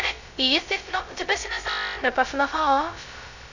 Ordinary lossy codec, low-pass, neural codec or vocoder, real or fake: none; 7.2 kHz; codec, 16 kHz, 0.2 kbps, FocalCodec; fake